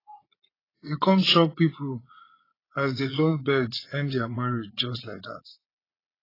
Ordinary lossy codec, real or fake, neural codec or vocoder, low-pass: AAC, 24 kbps; fake; vocoder, 22.05 kHz, 80 mel bands, Vocos; 5.4 kHz